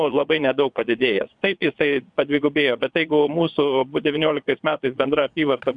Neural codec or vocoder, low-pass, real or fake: vocoder, 22.05 kHz, 80 mel bands, Vocos; 9.9 kHz; fake